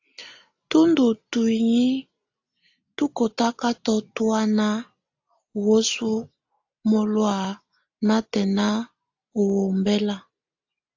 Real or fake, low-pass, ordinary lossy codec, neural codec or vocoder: real; 7.2 kHz; AAC, 48 kbps; none